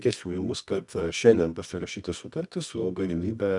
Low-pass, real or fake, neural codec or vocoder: 10.8 kHz; fake; codec, 24 kHz, 0.9 kbps, WavTokenizer, medium music audio release